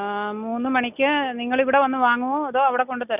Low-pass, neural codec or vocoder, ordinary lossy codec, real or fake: 3.6 kHz; none; none; real